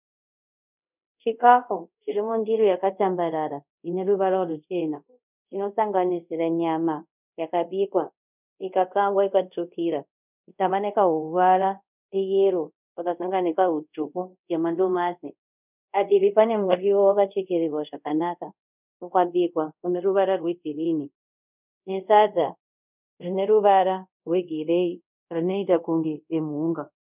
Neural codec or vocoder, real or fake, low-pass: codec, 24 kHz, 0.5 kbps, DualCodec; fake; 3.6 kHz